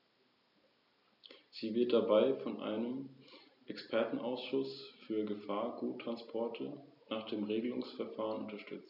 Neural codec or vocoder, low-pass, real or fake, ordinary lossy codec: none; 5.4 kHz; real; none